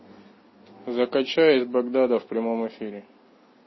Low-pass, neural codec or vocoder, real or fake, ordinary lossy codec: 7.2 kHz; none; real; MP3, 24 kbps